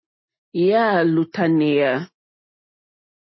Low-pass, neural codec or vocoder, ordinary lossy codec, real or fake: 7.2 kHz; none; MP3, 24 kbps; real